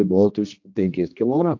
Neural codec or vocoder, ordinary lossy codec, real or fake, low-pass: codec, 16 kHz, 1 kbps, X-Codec, HuBERT features, trained on general audio; AAC, 48 kbps; fake; 7.2 kHz